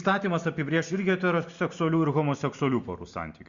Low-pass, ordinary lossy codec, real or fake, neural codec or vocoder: 7.2 kHz; Opus, 64 kbps; real; none